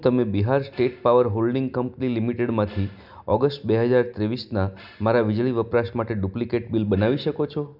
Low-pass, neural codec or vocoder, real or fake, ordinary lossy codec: 5.4 kHz; none; real; none